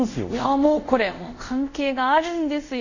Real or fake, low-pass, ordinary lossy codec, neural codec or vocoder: fake; 7.2 kHz; none; codec, 24 kHz, 0.5 kbps, DualCodec